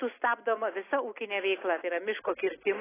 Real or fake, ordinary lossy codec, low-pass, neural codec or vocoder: real; AAC, 16 kbps; 3.6 kHz; none